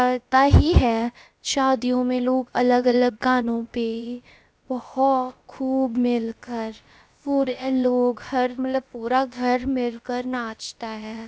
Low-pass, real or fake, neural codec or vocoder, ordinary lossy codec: none; fake; codec, 16 kHz, about 1 kbps, DyCAST, with the encoder's durations; none